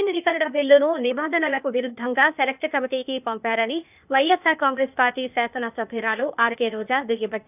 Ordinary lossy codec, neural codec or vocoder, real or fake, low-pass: none; codec, 16 kHz, 0.8 kbps, ZipCodec; fake; 3.6 kHz